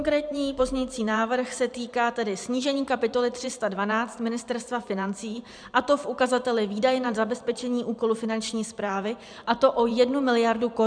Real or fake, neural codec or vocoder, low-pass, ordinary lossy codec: fake; vocoder, 44.1 kHz, 128 mel bands every 512 samples, BigVGAN v2; 9.9 kHz; MP3, 96 kbps